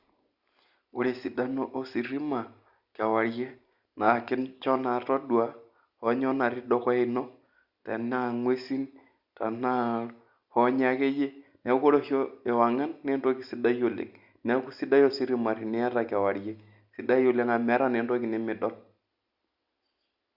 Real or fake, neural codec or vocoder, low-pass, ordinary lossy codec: real; none; 5.4 kHz; Opus, 64 kbps